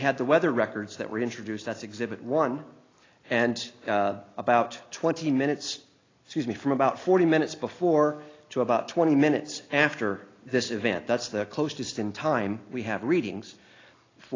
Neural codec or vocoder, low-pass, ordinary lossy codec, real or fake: none; 7.2 kHz; AAC, 32 kbps; real